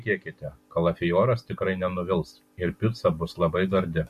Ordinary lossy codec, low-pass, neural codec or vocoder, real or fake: MP3, 64 kbps; 14.4 kHz; none; real